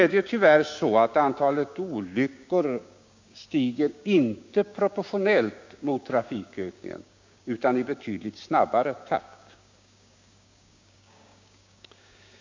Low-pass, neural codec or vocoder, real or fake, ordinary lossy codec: 7.2 kHz; codec, 16 kHz, 6 kbps, DAC; fake; MP3, 48 kbps